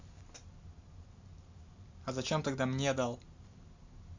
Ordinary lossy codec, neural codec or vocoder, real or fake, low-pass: MP3, 64 kbps; none; real; 7.2 kHz